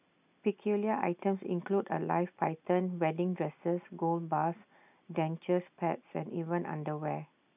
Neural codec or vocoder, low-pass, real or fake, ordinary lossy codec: none; 3.6 kHz; real; none